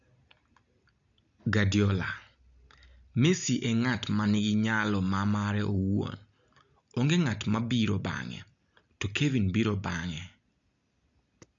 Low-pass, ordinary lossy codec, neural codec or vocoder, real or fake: 7.2 kHz; none; none; real